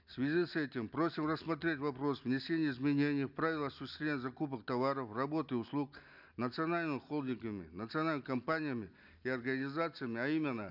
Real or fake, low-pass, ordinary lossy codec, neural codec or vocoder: real; 5.4 kHz; none; none